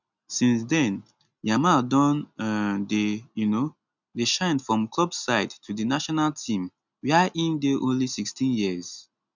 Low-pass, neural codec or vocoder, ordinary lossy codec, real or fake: 7.2 kHz; none; none; real